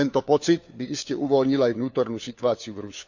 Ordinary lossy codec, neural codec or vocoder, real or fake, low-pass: none; codec, 16 kHz, 4 kbps, FunCodec, trained on LibriTTS, 50 frames a second; fake; 7.2 kHz